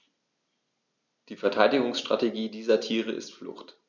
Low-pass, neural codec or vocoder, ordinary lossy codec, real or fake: 7.2 kHz; none; none; real